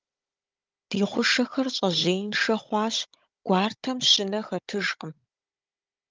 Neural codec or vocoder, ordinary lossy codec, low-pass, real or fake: codec, 16 kHz, 16 kbps, FunCodec, trained on Chinese and English, 50 frames a second; Opus, 24 kbps; 7.2 kHz; fake